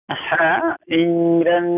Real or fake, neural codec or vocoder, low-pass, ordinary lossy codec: real; none; 3.6 kHz; none